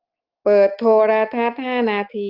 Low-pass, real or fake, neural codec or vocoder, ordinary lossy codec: 5.4 kHz; fake; autoencoder, 48 kHz, 128 numbers a frame, DAC-VAE, trained on Japanese speech; Opus, 24 kbps